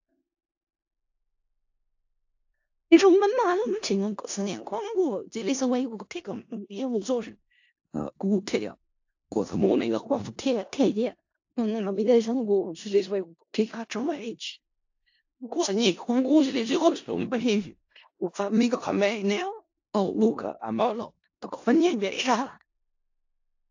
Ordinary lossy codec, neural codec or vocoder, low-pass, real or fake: AAC, 48 kbps; codec, 16 kHz in and 24 kHz out, 0.4 kbps, LongCat-Audio-Codec, four codebook decoder; 7.2 kHz; fake